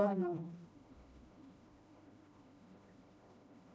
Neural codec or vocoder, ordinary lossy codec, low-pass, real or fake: codec, 16 kHz, 1 kbps, FreqCodec, smaller model; none; none; fake